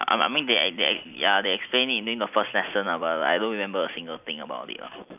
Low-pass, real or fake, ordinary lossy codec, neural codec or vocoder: 3.6 kHz; real; none; none